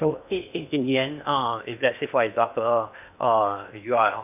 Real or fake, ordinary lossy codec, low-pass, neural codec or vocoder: fake; none; 3.6 kHz; codec, 16 kHz in and 24 kHz out, 0.8 kbps, FocalCodec, streaming, 65536 codes